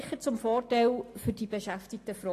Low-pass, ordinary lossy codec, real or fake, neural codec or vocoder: 14.4 kHz; AAC, 48 kbps; real; none